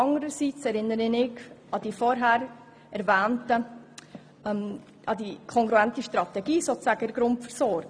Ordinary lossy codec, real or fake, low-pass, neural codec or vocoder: none; real; none; none